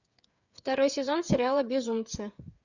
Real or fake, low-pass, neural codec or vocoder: fake; 7.2 kHz; codec, 16 kHz, 8 kbps, FreqCodec, smaller model